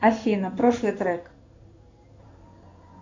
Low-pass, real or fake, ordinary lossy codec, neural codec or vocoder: 7.2 kHz; fake; MP3, 48 kbps; codec, 16 kHz in and 24 kHz out, 1 kbps, XY-Tokenizer